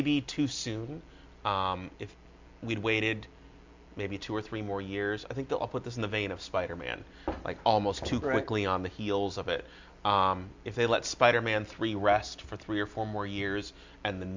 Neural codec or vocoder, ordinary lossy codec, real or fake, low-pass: none; MP3, 64 kbps; real; 7.2 kHz